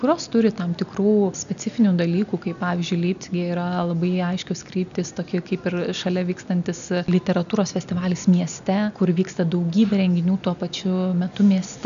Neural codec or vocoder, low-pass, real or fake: none; 7.2 kHz; real